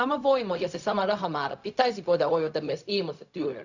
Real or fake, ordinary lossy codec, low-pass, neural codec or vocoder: fake; none; 7.2 kHz; codec, 16 kHz, 0.4 kbps, LongCat-Audio-Codec